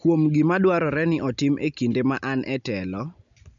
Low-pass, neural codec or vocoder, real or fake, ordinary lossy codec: 7.2 kHz; none; real; none